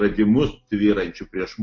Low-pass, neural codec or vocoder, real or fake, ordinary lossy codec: 7.2 kHz; none; real; AAC, 48 kbps